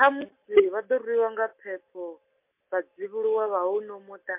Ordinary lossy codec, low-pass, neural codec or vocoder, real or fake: MP3, 32 kbps; 3.6 kHz; none; real